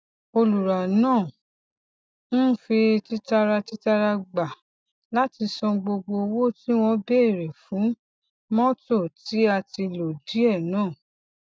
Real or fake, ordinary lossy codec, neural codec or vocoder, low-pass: real; none; none; none